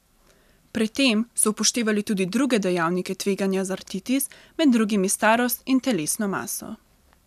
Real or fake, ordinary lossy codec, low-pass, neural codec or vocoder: real; none; 14.4 kHz; none